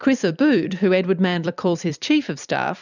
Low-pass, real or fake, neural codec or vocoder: 7.2 kHz; fake; vocoder, 44.1 kHz, 128 mel bands every 256 samples, BigVGAN v2